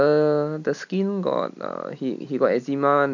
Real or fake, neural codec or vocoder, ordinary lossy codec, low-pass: real; none; none; 7.2 kHz